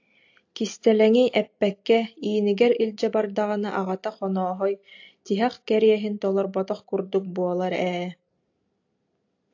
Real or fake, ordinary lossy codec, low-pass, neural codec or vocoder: real; AAC, 48 kbps; 7.2 kHz; none